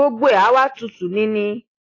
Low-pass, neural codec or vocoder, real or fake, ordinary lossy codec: 7.2 kHz; none; real; AAC, 32 kbps